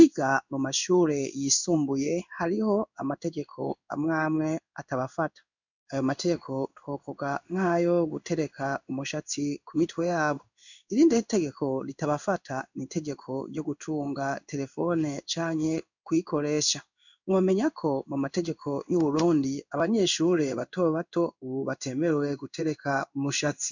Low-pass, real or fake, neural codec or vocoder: 7.2 kHz; fake; codec, 16 kHz in and 24 kHz out, 1 kbps, XY-Tokenizer